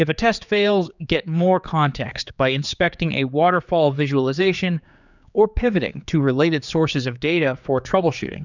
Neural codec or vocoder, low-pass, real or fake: codec, 16 kHz, 4 kbps, X-Codec, HuBERT features, trained on general audio; 7.2 kHz; fake